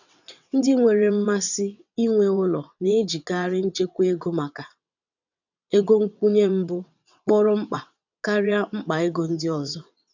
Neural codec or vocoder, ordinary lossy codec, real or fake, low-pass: vocoder, 22.05 kHz, 80 mel bands, WaveNeXt; none; fake; 7.2 kHz